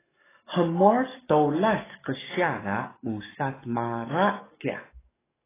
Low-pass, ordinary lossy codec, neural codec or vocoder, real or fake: 3.6 kHz; AAC, 16 kbps; codec, 44.1 kHz, 7.8 kbps, DAC; fake